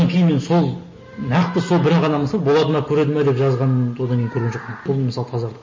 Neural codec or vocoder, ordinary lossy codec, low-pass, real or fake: none; MP3, 32 kbps; 7.2 kHz; real